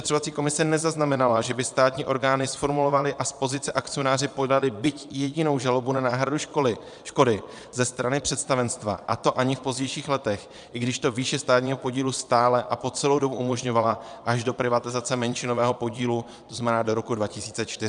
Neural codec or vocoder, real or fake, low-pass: vocoder, 22.05 kHz, 80 mel bands, Vocos; fake; 9.9 kHz